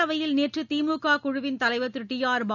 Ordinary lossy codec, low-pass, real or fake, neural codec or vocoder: none; 7.2 kHz; real; none